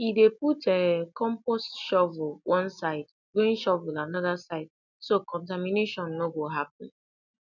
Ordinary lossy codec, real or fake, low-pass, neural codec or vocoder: none; real; 7.2 kHz; none